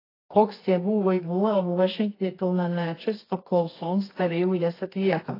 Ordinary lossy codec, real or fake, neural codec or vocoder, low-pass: AAC, 24 kbps; fake; codec, 24 kHz, 0.9 kbps, WavTokenizer, medium music audio release; 5.4 kHz